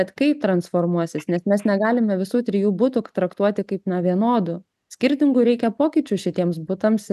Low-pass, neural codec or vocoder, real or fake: 14.4 kHz; none; real